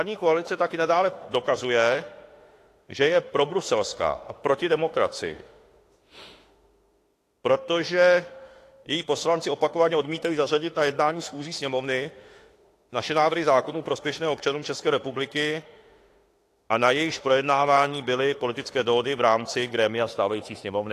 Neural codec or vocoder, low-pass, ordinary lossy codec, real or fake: autoencoder, 48 kHz, 32 numbers a frame, DAC-VAE, trained on Japanese speech; 14.4 kHz; AAC, 48 kbps; fake